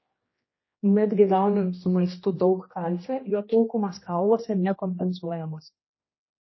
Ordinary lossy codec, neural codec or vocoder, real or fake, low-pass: MP3, 24 kbps; codec, 16 kHz, 1 kbps, X-Codec, HuBERT features, trained on general audio; fake; 7.2 kHz